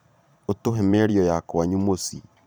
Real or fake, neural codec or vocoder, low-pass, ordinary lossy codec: fake; vocoder, 44.1 kHz, 128 mel bands every 512 samples, BigVGAN v2; none; none